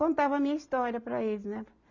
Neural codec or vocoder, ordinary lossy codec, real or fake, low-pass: none; none; real; 7.2 kHz